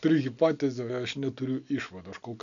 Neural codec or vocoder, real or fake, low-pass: none; real; 7.2 kHz